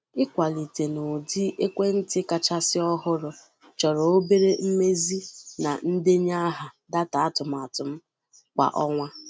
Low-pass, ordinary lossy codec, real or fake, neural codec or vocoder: none; none; real; none